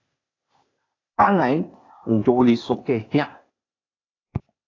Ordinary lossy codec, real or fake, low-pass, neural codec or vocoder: AAC, 32 kbps; fake; 7.2 kHz; codec, 16 kHz, 0.8 kbps, ZipCodec